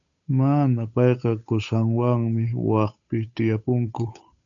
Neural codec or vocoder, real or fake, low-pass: codec, 16 kHz, 8 kbps, FunCodec, trained on Chinese and English, 25 frames a second; fake; 7.2 kHz